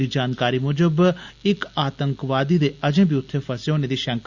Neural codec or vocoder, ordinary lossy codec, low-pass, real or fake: none; Opus, 64 kbps; 7.2 kHz; real